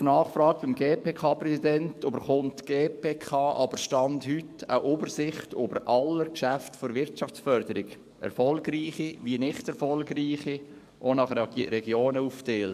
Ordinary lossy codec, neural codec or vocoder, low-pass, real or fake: MP3, 96 kbps; codec, 44.1 kHz, 7.8 kbps, DAC; 14.4 kHz; fake